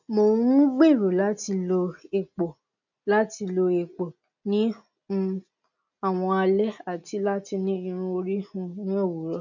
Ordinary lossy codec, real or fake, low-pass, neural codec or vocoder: none; real; 7.2 kHz; none